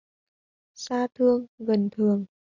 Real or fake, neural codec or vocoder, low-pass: real; none; 7.2 kHz